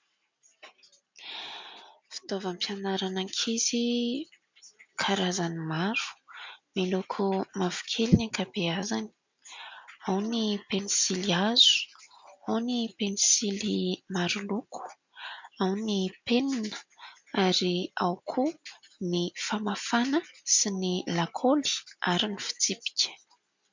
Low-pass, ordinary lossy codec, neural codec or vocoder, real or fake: 7.2 kHz; MP3, 64 kbps; none; real